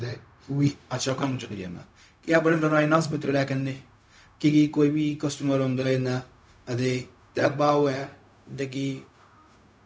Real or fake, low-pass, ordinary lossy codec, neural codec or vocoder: fake; none; none; codec, 16 kHz, 0.4 kbps, LongCat-Audio-Codec